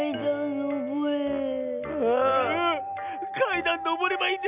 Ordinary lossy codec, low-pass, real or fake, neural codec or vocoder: none; 3.6 kHz; real; none